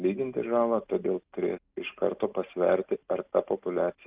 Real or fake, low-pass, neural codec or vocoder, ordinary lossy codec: real; 3.6 kHz; none; Opus, 24 kbps